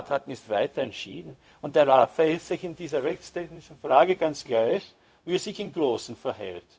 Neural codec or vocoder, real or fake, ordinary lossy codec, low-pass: codec, 16 kHz, 0.4 kbps, LongCat-Audio-Codec; fake; none; none